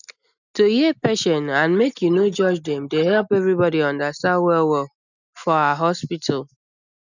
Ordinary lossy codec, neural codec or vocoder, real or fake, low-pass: none; none; real; 7.2 kHz